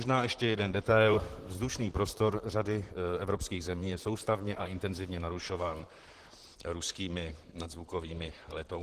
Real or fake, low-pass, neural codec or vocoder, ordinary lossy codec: fake; 14.4 kHz; vocoder, 44.1 kHz, 128 mel bands, Pupu-Vocoder; Opus, 16 kbps